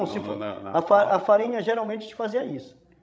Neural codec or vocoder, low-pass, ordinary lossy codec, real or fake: codec, 16 kHz, 16 kbps, FreqCodec, larger model; none; none; fake